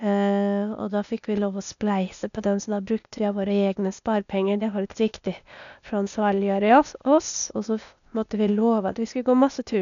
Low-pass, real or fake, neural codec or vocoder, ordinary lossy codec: 7.2 kHz; fake; codec, 16 kHz, 0.8 kbps, ZipCodec; none